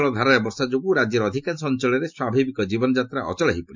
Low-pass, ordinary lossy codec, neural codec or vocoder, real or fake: 7.2 kHz; MP3, 64 kbps; none; real